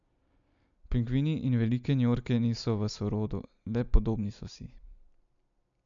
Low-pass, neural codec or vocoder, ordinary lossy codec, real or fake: 7.2 kHz; none; none; real